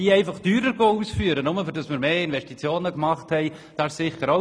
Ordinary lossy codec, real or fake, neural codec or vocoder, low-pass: none; real; none; none